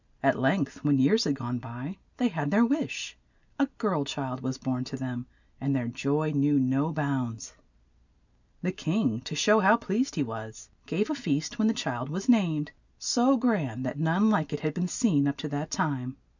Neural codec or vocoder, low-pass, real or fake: none; 7.2 kHz; real